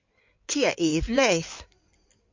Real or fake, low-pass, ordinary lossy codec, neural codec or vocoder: fake; 7.2 kHz; MP3, 48 kbps; codec, 16 kHz in and 24 kHz out, 2.2 kbps, FireRedTTS-2 codec